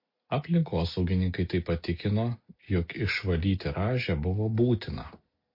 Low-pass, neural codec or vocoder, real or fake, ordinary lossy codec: 5.4 kHz; none; real; MP3, 32 kbps